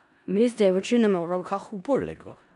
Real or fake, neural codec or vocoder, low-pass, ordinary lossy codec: fake; codec, 16 kHz in and 24 kHz out, 0.4 kbps, LongCat-Audio-Codec, four codebook decoder; 10.8 kHz; none